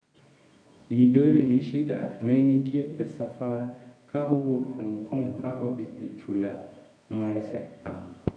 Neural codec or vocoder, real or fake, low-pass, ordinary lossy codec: codec, 24 kHz, 0.9 kbps, WavTokenizer, medium music audio release; fake; 9.9 kHz; none